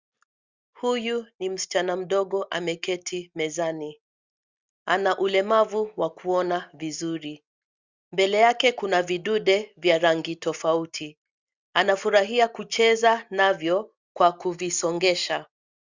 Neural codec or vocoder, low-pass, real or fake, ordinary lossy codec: none; 7.2 kHz; real; Opus, 64 kbps